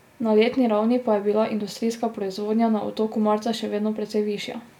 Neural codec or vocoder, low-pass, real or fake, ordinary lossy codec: none; 19.8 kHz; real; none